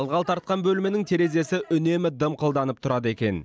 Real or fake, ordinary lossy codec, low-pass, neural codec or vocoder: real; none; none; none